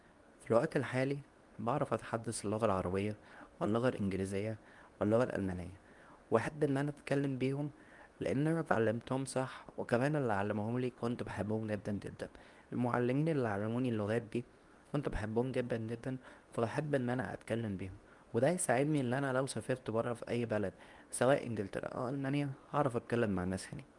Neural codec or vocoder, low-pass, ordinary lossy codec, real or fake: codec, 24 kHz, 0.9 kbps, WavTokenizer, medium speech release version 2; 10.8 kHz; Opus, 32 kbps; fake